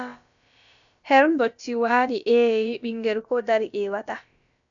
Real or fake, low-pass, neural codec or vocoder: fake; 7.2 kHz; codec, 16 kHz, about 1 kbps, DyCAST, with the encoder's durations